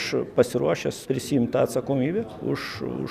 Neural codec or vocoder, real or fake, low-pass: none; real; 14.4 kHz